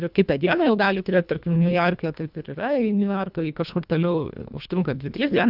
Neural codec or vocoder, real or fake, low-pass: codec, 24 kHz, 1.5 kbps, HILCodec; fake; 5.4 kHz